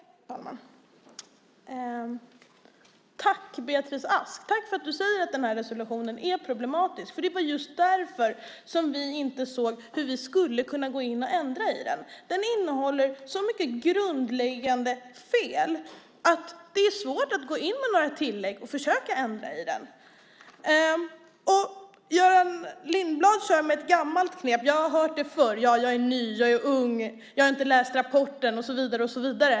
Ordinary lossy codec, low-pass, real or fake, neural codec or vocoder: none; none; real; none